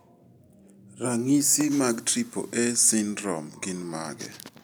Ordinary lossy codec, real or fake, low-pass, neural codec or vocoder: none; real; none; none